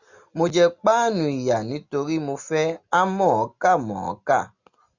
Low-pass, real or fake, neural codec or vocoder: 7.2 kHz; real; none